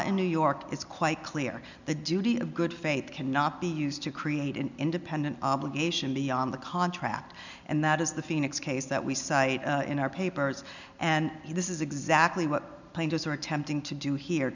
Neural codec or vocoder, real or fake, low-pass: none; real; 7.2 kHz